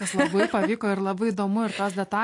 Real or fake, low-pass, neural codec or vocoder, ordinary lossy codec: real; 10.8 kHz; none; AAC, 48 kbps